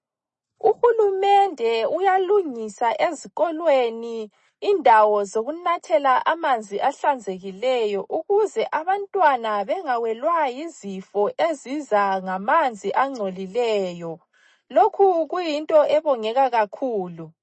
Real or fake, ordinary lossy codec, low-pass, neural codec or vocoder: real; MP3, 32 kbps; 10.8 kHz; none